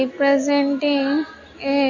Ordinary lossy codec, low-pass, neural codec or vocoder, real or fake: MP3, 32 kbps; 7.2 kHz; none; real